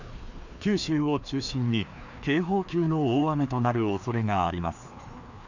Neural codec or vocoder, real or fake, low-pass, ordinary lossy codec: codec, 16 kHz, 2 kbps, FreqCodec, larger model; fake; 7.2 kHz; none